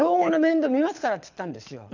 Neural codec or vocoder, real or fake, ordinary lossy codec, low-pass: codec, 24 kHz, 6 kbps, HILCodec; fake; none; 7.2 kHz